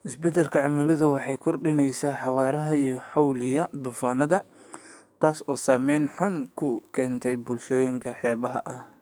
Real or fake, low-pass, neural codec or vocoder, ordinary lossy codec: fake; none; codec, 44.1 kHz, 2.6 kbps, SNAC; none